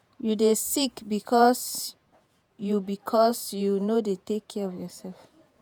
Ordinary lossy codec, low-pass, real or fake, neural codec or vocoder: none; none; fake; vocoder, 48 kHz, 128 mel bands, Vocos